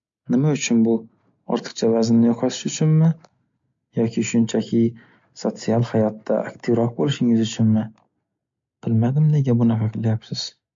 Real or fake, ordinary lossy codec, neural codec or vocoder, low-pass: real; MP3, 48 kbps; none; 7.2 kHz